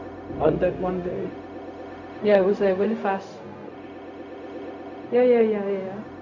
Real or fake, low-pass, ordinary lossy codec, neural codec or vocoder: fake; 7.2 kHz; none; codec, 16 kHz, 0.4 kbps, LongCat-Audio-Codec